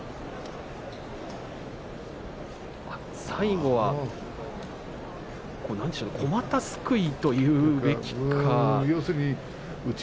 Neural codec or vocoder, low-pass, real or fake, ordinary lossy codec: none; none; real; none